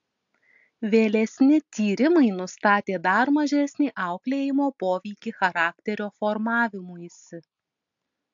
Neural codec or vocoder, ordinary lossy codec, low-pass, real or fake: none; AAC, 64 kbps; 7.2 kHz; real